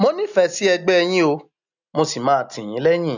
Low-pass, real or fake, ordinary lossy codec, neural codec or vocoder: 7.2 kHz; real; none; none